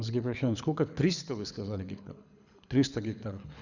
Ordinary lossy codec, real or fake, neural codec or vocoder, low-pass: none; fake; codec, 24 kHz, 6 kbps, HILCodec; 7.2 kHz